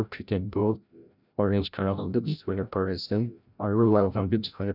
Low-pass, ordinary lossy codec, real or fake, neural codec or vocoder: 5.4 kHz; none; fake; codec, 16 kHz, 0.5 kbps, FreqCodec, larger model